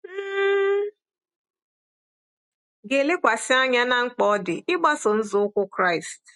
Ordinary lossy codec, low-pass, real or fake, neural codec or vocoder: MP3, 48 kbps; 14.4 kHz; real; none